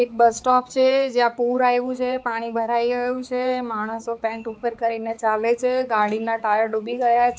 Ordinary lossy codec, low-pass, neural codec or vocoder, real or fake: none; none; codec, 16 kHz, 4 kbps, X-Codec, HuBERT features, trained on balanced general audio; fake